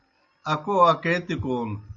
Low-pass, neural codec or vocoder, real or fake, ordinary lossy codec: 7.2 kHz; none; real; Opus, 64 kbps